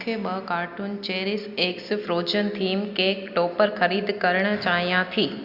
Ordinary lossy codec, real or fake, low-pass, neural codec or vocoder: Opus, 64 kbps; real; 5.4 kHz; none